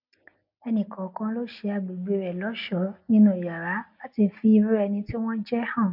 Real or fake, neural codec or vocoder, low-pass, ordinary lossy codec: real; none; 5.4 kHz; MP3, 48 kbps